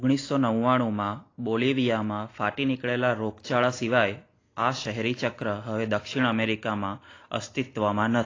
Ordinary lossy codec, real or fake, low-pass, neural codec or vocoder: AAC, 32 kbps; real; 7.2 kHz; none